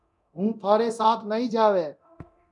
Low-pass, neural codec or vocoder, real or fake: 10.8 kHz; codec, 24 kHz, 0.9 kbps, DualCodec; fake